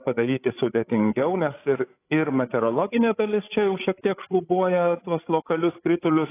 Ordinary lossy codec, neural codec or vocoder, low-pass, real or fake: AAC, 24 kbps; codec, 16 kHz, 16 kbps, FreqCodec, larger model; 3.6 kHz; fake